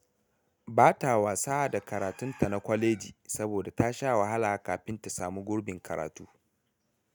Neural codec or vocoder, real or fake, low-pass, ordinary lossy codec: none; real; none; none